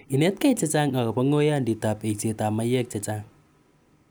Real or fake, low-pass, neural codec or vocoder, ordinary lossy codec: real; none; none; none